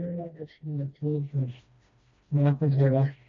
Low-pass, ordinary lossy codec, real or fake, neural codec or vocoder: 7.2 kHz; MP3, 48 kbps; fake; codec, 16 kHz, 1 kbps, FreqCodec, smaller model